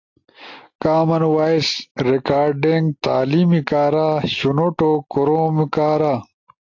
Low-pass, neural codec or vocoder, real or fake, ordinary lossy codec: 7.2 kHz; none; real; AAC, 48 kbps